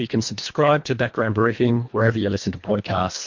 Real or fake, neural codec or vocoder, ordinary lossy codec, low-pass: fake; codec, 24 kHz, 1.5 kbps, HILCodec; MP3, 48 kbps; 7.2 kHz